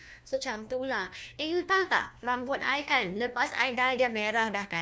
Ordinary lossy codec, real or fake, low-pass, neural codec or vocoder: none; fake; none; codec, 16 kHz, 1 kbps, FunCodec, trained on LibriTTS, 50 frames a second